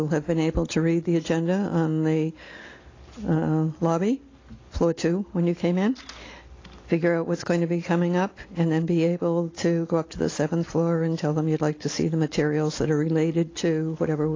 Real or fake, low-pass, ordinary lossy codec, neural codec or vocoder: real; 7.2 kHz; AAC, 32 kbps; none